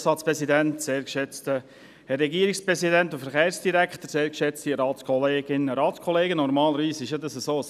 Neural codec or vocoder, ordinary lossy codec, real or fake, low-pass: none; none; real; 14.4 kHz